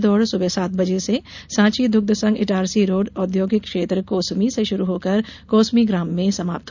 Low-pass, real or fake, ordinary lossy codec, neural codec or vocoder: 7.2 kHz; real; none; none